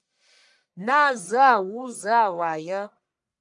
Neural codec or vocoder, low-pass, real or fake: codec, 44.1 kHz, 1.7 kbps, Pupu-Codec; 10.8 kHz; fake